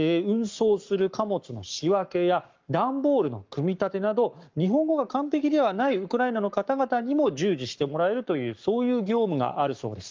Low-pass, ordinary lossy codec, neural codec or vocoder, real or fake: 7.2 kHz; Opus, 32 kbps; codec, 44.1 kHz, 7.8 kbps, Pupu-Codec; fake